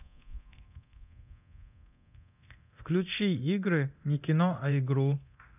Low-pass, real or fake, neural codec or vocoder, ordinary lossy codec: 3.6 kHz; fake; codec, 24 kHz, 0.9 kbps, DualCodec; none